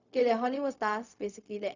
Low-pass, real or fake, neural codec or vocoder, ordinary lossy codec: 7.2 kHz; fake; codec, 16 kHz, 0.4 kbps, LongCat-Audio-Codec; none